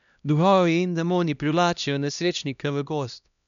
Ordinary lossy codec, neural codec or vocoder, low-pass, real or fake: none; codec, 16 kHz, 1 kbps, X-Codec, HuBERT features, trained on LibriSpeech; 7.2 kHz; fake